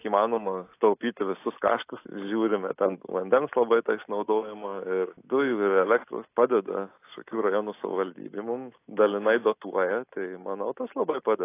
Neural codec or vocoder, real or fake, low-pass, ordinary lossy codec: none; real; 3.6 kHz; AAC, 24 kbps